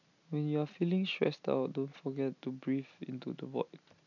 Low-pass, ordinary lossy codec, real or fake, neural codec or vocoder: 7.2 kHz; none; real; none